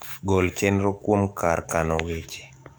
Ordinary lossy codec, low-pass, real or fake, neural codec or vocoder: none; none; fake; codec, 44.1 kHz, 7.8 kbps, DAC